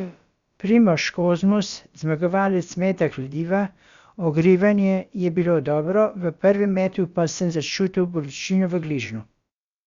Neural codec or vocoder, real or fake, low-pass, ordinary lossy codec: codec, 16 kHz, about 1 kbps, DyCAST, with the encoder's durations; fake; 7.2 kHz; Opus, 64 kbps